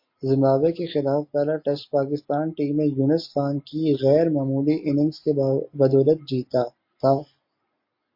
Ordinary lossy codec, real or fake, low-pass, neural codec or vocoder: MP3, 32 kbps; real; 5.4 kHz; none